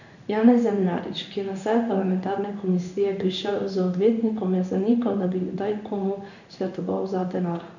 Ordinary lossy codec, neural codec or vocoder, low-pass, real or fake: none; codec, 16 kHz in and 24 kHz out, 1 kbps, XY-Tokenizer; 7.2 kHz; fake